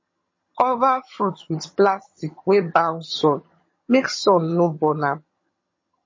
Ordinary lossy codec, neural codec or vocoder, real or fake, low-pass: MP3, 32 kbps; vocoder, 22.05 kHz, 80 mel bands, HiFi-GAN; fake; 7.2 kHz